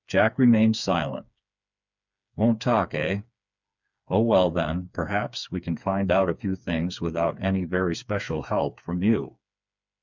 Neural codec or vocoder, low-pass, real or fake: codec, 16 kHz, 4 kbps, FreqCodec, smaller model; 7.2 kHz; fake